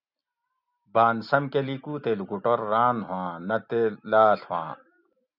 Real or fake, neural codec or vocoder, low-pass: real; none; 5.4 kHz